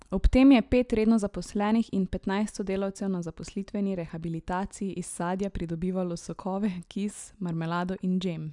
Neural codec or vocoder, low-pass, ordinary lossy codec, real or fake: none; 10.8 kHz; none; real